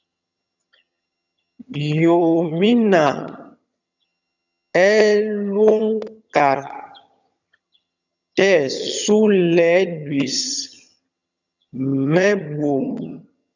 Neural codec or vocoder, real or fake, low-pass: vocoder, 22.05 kHz, 80 mel bands, HiFi-GAN; fake; 7.2 kHz